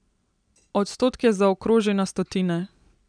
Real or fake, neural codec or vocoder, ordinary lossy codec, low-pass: real; none; none; 9.9 kHz